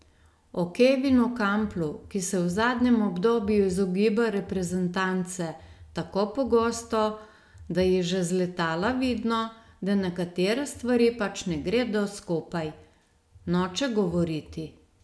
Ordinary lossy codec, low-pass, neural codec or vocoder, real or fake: none; none; none; real